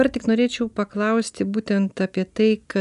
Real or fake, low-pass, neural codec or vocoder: real; 10.8 kHz; none